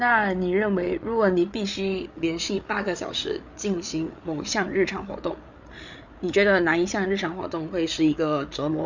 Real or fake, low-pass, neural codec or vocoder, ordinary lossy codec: fake; 7.2 kHz; codec, 16 kHz, 8 kbps, FreqCodec, larger model; none